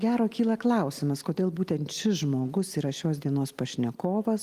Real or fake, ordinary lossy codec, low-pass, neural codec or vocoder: real; Opus, 24 kbps; 14.4 kHz; none